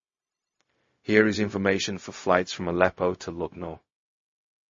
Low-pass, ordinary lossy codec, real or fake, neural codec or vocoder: 7.2 kHz; MP3, 32 kbps; fake; codec, 16 kHz, 0.4 kbps, LongCat-Audio-Codec